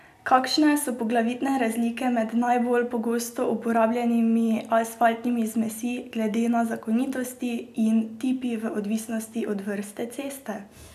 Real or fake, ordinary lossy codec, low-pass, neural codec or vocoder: real; none; 14.4 kHz; none